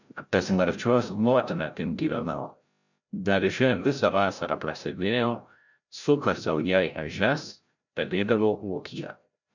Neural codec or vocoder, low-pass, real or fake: codec, 16 kHz, 0.5 kbps, FreqCodec, larger model; 7.2 kHz; fake